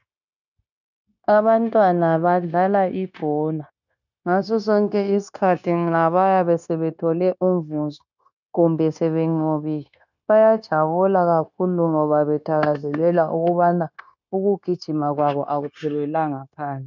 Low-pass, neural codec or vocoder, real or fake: 7.2 kHz; codec, 16 kHz, 0.9 kbps, LongCat-Audio-Codec; fake